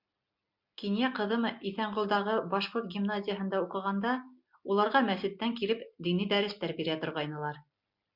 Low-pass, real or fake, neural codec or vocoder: 5.4 kHz; real; none